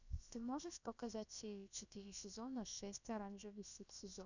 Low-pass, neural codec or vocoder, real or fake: 7.2 kHz; codec, 16 kHz, about 1 kbps, DyCAST, with the encoder's durations; fake